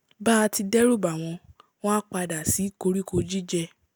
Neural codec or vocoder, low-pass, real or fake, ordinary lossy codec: none; none; real; none